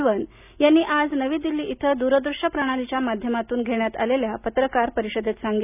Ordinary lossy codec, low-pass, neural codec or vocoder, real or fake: none; 3.6 kHz; none; real